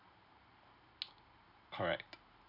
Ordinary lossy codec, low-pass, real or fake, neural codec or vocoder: none; 5.4 kHz; real; none